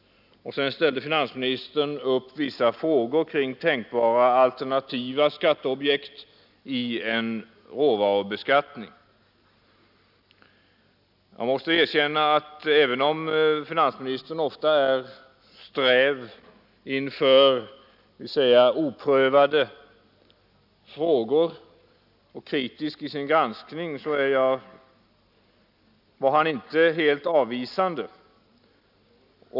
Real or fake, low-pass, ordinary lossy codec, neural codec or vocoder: real; 5.4 kHz; none; none